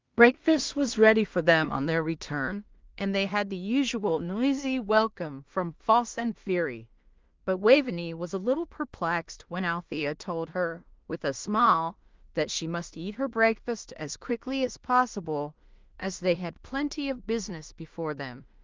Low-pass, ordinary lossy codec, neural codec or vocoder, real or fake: 7.2 kHz; Opus, 24 kbps; codec, 16 kHz in and 24 kHz out, 0.4 kbps, LongCat-Audio-Codec, two codebook decoder; fake